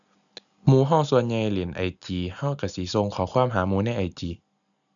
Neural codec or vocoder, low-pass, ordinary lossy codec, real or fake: none; 7.2 kHz; none; real